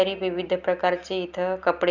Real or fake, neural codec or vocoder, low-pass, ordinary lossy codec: real; none; 7.2 kHz; none